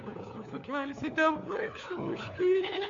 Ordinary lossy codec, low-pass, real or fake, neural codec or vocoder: MP3, 48 kbps; 7.2 kHz; fake; codec, 16 kHz, 4 kbps, FunCodec, trained on LibriTTS, 50 frames a second